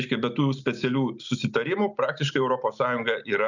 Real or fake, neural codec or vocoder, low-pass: real; none; 7.2 kHz